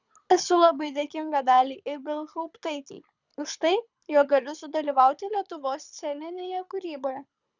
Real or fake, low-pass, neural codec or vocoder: fake; 7.2 kHz; codec, 24 kHz, 6 kbps, HILCodec